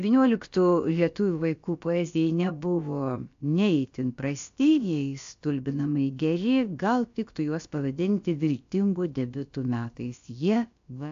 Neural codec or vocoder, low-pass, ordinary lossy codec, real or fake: codec, 16 kHz, about 1 kbps, DyCAST, with the encoder's durations; 7.2 kHz; AAC, 64 kbps; fake